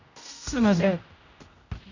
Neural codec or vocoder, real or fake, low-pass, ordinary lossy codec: codec, 16 kHz, 0.5 kbps, X-Codec, HuBERT features, trained on general audio; fake; 7.2 kHz; none